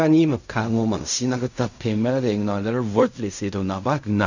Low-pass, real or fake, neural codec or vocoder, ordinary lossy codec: 7.2 kHz; fake; codec, 16 kHz in and 24 kHz out, 0.4 kbps, LongCat-Audio-Codec, fine tuned four codebook decoder; none